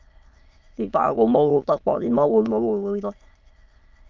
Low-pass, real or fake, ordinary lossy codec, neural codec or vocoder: 7.2 kHz; fake; Opus, 24 kbps; autoencoder, 22.05 kHz, a latent of 192 numbers a frame, VITS, trained on many speakers